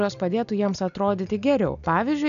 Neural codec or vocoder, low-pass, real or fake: none; 7.2 kHz; real